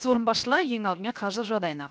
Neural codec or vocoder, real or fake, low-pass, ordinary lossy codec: codec, 16 kHz, 0.7 kbps, FocalCodec; fake; none; none